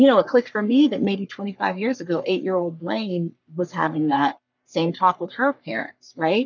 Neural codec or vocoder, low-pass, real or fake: codec, 44.1 kHz, 3.4 kbps, Pupu-Codec; 7.2 kHz; fake